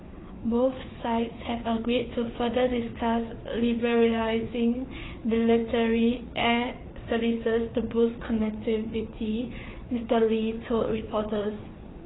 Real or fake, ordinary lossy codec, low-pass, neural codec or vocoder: fake; AAC, 16 kbps; 7.2 kHz; codec, 16 kHz, 4 kbps, FreqCodec, larger model